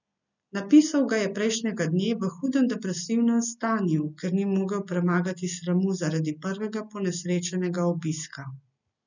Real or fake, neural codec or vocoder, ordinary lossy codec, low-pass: real; none; none; 7.2 kHz